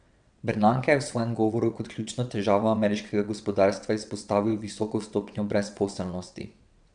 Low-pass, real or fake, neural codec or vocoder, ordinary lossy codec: 9.9 kHz; fake; vocoder, 22.05 kHz, 80 mel bands, WaveNeXt; none